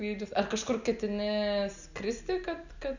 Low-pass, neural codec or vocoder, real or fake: 7.2 kHz; none; real